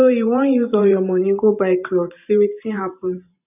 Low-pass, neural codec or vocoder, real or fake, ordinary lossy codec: 3.6 kHz; vocoder, 44.1 kHz, 128 mel bands every 512 samples, BigVGAN v2; fake; none